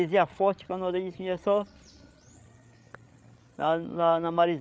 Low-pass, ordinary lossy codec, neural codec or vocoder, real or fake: none; none; codec, 16 kHz, 8 kbps, FreqCodec, larger model; fake